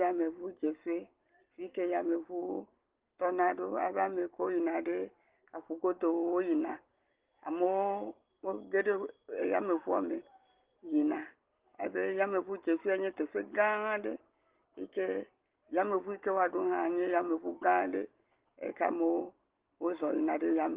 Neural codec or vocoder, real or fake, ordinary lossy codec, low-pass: none; real; Opus, 16 kbps; 3.6 kHz